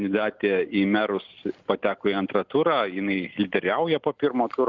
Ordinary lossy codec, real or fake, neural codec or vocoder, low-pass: Opus, 32 kbps; real; none; 7.2 kHz